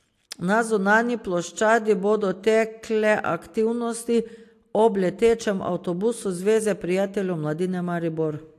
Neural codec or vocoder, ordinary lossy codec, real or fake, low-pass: none; AAC, 64 kbps; real; 14.4 kHz